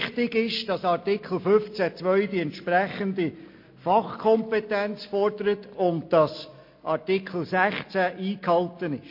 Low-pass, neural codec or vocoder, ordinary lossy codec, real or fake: 5.4 kHz; none; MP3, 32 kbps; real